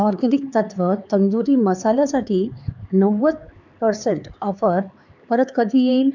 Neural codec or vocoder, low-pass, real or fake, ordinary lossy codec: codec, 16 kHz, 4 kbps, X-Codec, HuBERT features, trained on LibriSpeech; 7.2 kHz; fake; none